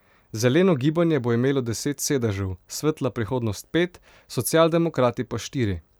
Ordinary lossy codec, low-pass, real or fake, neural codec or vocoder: none; none; fake; vocoder, 44.1 kHz, 128 mel bands every 512 samples, BigVGAN v2